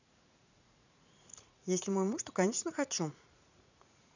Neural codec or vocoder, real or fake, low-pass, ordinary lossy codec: vocoder, 44.1 kHz, 80 mel bands, Vocos; fake; 7.2 kHz; none